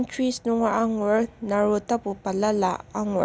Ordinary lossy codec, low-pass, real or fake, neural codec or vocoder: none; none; real; none